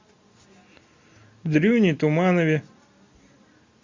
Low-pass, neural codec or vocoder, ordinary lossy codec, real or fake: 7.2 kHz; none; MP3, 64 kbps; real